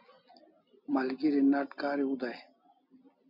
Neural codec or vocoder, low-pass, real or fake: none; 5.4 kHz; real